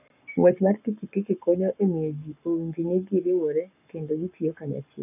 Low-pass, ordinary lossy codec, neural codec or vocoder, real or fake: 3.6 kHz; none; codec, 44.1 kHz, 7.8 kbps, Pupu-Codec; fake